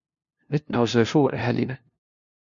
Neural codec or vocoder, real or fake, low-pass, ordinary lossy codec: codec, 16 kHz, 0.5 kbps, FunCodec, trained on LibriTTS, 25 frames a second; fake; 7.2 kHz; MP3, 48 kbps